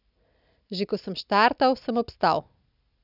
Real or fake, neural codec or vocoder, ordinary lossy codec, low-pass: real; none; none; 5.4 kHz